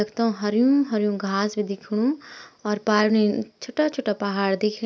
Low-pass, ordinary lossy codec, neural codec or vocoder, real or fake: none; none; none; real